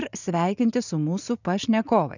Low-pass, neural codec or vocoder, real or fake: 7.2 kHz; vocoder, 44.1 kHz, 80 mel bands, Vocos; fake